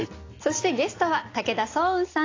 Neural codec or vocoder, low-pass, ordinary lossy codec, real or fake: none; 7.2 kHz; AAC, 32 kbps; real